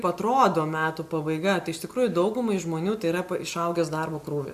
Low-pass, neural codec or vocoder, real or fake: 14.4 kHz; none; real